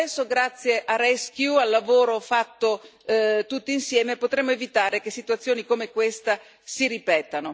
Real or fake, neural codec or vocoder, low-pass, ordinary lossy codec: real; none; none; none